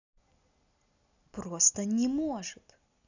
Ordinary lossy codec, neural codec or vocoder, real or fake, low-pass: none; none; real; 7.2 kHz